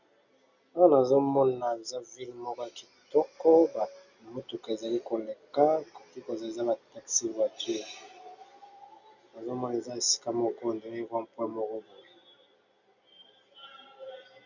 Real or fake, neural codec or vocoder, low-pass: real; none; 7.2 kHz